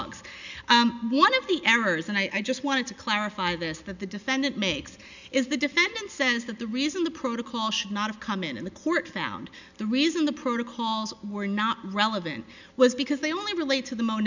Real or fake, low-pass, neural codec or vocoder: real; 7.2 kHz; none